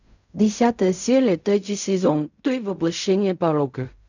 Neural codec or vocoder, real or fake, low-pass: codec, 16 kHz in and 24 kHz out, 0.4 kbps, LongCat-Audio-Codec, fine tuned four codebook decoder; fake; 7.2 kHz